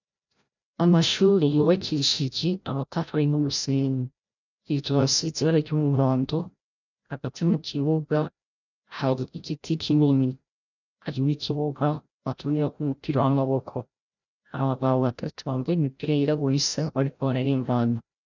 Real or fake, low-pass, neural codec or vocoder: fake; 7.2 kHz; codec, 16 kHz, 0.5 kbps, FreqCodec, larger model